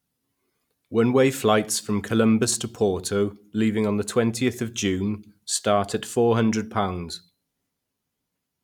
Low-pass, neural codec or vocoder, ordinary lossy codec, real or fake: 19.8 kHz; none; none; real